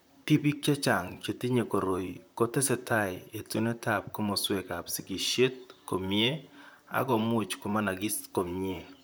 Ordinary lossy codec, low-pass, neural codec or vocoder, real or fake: none; none; vocoder, 44.1 kHz, 128 mel bands, Pupu-Vocoder; fake